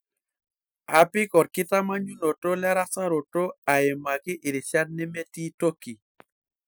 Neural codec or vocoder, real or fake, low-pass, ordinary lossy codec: none; real; none; none